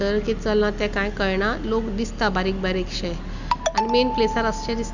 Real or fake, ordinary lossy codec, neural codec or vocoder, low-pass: real; none; none; 7.2 kHz